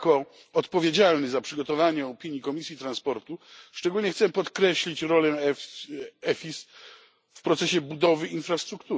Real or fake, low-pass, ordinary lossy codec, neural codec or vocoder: real; none; none; none